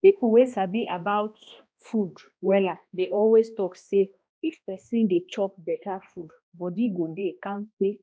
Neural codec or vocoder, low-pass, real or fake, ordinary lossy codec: codec, 16 kHz, 1 kbps, X-Codec, HuBERT features, trained on balanced general audio; none; fake; none